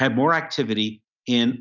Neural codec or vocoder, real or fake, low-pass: none; real; 7.2 kHz